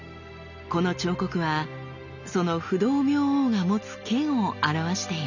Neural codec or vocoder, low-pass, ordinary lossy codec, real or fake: none; 7.2 kHz; none; real